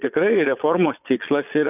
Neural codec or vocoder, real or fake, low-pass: vocoder, 22.05 kHz, 80 mel bands, Vocos; fake; 3.6 kHz